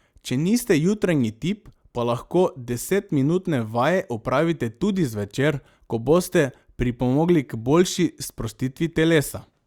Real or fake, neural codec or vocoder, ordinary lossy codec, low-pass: real; none; Opus, 64 kbps; 19.8 kHz